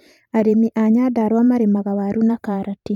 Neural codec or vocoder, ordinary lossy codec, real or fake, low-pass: vocoder, 44.1 kHz, 128 mel bands every 512 samples, BigVGAN v2; none; fake; 19.8 kHz